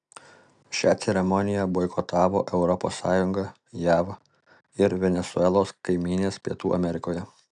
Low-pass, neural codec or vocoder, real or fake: 9.9 kHz; none; real